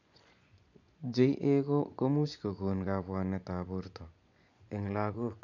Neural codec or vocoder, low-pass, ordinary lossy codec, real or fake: vocoder, 24 kHz, 100 mel bands, Vocos; 7.2 kHz; none; fake